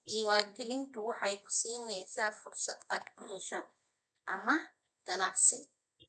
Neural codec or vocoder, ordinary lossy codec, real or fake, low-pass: codec, 24 kHz, 0.9 kbps, WavTokenizer, medium music audio release; none; fake; none